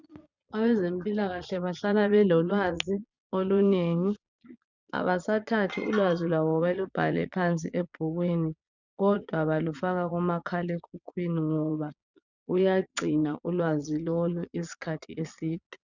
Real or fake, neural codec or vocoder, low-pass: fake; vocoder, 22.05 kHz, 80 mel bands, Vocos; 7.2 kHz